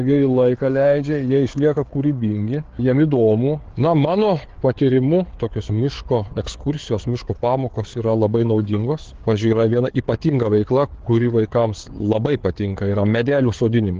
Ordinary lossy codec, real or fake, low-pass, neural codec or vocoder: Opus, 16 kbps; fake; 7.2 kHz; codec, 16 kHz, 4 kbps, FreqCodec, larger model